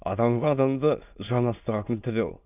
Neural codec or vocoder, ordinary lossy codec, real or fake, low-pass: autoencoder, 22.05 kHz, a latent of 192 numbers a frame, VITS, trained on many speakers; none; fake; 3.6 kHz